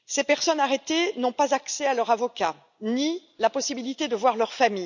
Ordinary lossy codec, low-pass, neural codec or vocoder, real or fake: none; 7.2 kHz; none; real